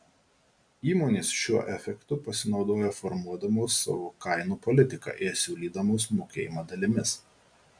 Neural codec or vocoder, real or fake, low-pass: none; real; 9.9 kHz